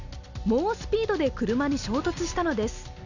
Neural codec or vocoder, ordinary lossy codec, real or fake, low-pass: none; none; real; 7.2 kHz